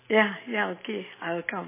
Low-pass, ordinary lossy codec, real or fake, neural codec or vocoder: 3.6 kHz; MP3, 16 kbps; real; none